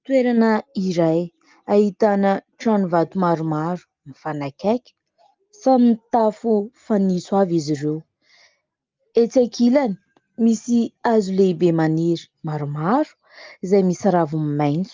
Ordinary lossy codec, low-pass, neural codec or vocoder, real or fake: Opus, 24 kbps; 7.2 kHz; none; real